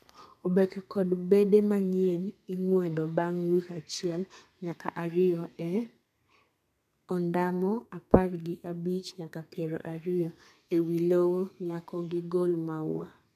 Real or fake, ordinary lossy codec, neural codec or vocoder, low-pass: fake; none; codec, 32 kHz, 1.9 kbps, SNAC; 14.4 kHz